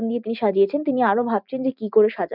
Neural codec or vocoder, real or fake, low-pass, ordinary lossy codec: none; real; 5.4 kHz; none